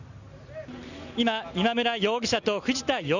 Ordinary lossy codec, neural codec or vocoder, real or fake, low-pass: none; none; real; 7.2 kHz